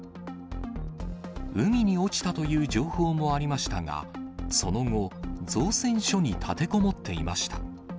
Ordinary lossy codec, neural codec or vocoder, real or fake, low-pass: none; none; real; none